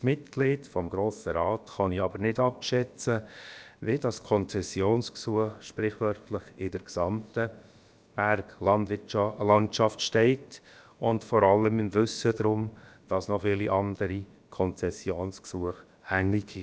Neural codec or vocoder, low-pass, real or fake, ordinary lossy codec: codec, 16 kHz, about 1 kbps, DyCAST, with the encoder's durations; none; fake; none